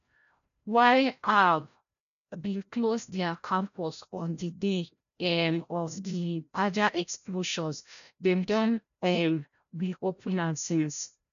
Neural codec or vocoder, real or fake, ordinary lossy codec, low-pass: codec, 16 kHz, 0.5 kbps, FreqCodec, larger model; fake; none; 7.2 kHz